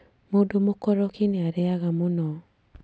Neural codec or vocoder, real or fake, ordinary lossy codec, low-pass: none; real; none; none